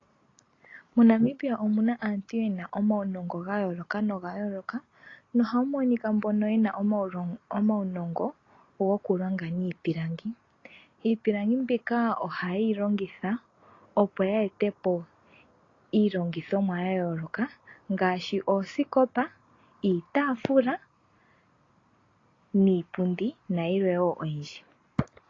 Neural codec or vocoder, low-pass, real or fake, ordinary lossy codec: none; 7.2 kHz; real; AAC, 32 kbps